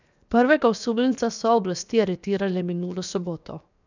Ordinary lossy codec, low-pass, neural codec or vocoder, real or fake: none; 7.2 kHz; codec, 16 kHz, 0.7 kbps, FocalCodec; fake